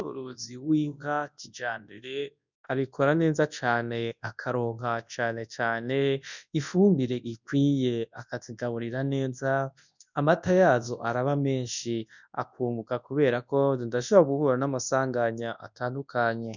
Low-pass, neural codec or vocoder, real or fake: 7.2 kHz; codec, 24 kHz, 0.9 kbps, WavTokenizer, large speech release; fake